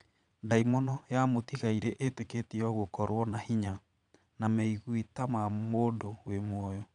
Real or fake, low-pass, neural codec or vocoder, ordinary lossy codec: fake; 9.9 kHz; vocoder, 22.05 kHz, 80 mel bands, Vocos; none